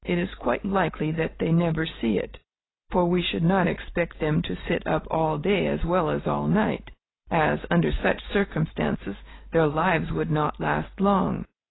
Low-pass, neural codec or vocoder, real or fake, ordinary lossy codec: 7.2 kHz; none; real; AAC, 16 kbps